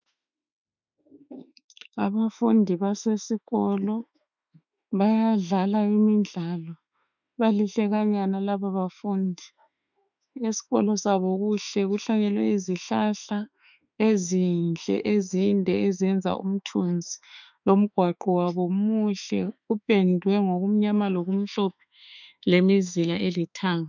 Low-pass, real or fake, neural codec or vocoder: 7.2 kHz; fake; autoencoder, 48 kHz, 32 numbers a frame, DAC-VAE, trained on Japanese speech